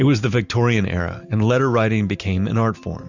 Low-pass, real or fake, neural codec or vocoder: 7.2 kHz; real; none